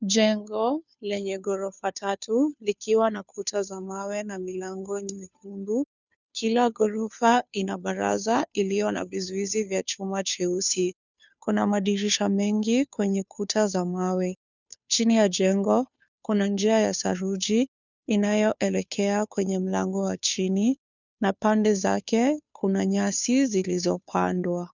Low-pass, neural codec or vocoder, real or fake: 7.2 kHz; codec, 16 kHz, 2 kbps, FunCodec, trained on Chinese and English, 25 frames a second; fake